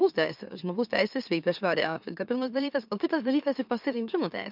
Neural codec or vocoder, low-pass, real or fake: autoencoder, 44.1 kHz, a latent of 192 numbers a frame, MeloTTS; 5.4 kHz; fake